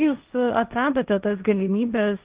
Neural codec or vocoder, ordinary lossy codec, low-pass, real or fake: codec, 16 kHz, 1.1 kbps, Voila-Tokenizer; Opus, 24 kbps; 3.6 kHz; fake